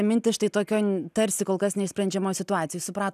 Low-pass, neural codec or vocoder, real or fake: 14.4 kHz; none; real